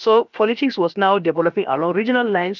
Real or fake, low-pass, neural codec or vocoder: fake; 7.2 kHz; codec, 16 kHz, about 1 kbps, DyCAST, with the encoder's durations